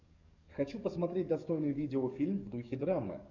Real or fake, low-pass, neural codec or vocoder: fake; 7.2 kHz; codec, 44.1 kHz, 7.8 kbps, DAC